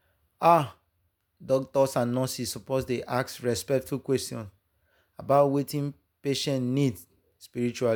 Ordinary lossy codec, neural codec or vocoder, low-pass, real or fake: none; none; none; real